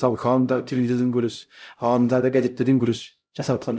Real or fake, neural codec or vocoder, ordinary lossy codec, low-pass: fake; codec, 16 kHz, 0.5 kbps, X-Codec, HuBERT features, trained on LibriSpeech; none; none